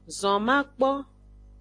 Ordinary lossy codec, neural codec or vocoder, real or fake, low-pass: AAC, 48 kbps; none; real; 9.9 kHz